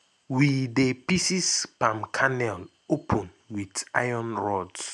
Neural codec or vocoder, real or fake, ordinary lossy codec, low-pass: none; real; none; none